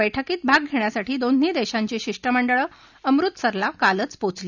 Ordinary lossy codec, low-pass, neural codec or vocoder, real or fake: none; 7.2 kHz; none; real